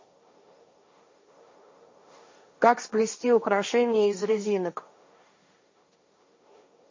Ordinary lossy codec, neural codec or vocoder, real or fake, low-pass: MP3, 32 kbps; codec, 16 kHz, 1.1 kbps, Voila-Tokenizer; fake; 7.2 kHz